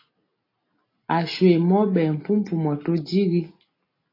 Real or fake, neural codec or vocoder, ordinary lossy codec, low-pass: real; none; AAC, 24 kbps; 5.4 kHz